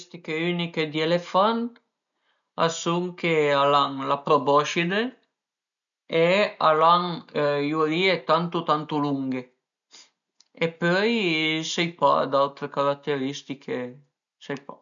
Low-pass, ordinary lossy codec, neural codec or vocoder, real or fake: 7.2 kHz; none; none; real